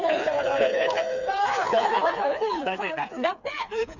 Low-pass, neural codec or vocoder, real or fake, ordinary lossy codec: 7.2 kHz; codec, 16 kHz, 4 kbps, FreqCodec, smaller model; fake; none